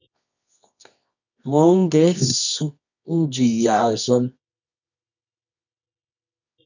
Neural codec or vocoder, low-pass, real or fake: codec, 24 kHz, 0.9 kbps, WavTokenizer, medium music audio release; 7.2 kHz; fake